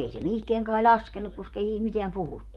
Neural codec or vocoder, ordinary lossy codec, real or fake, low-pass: vocoder, 44.1 kHz, 128 mel bands, Pupu-Vocoder; Opus, 32 kbps; fake; 19.8 kHz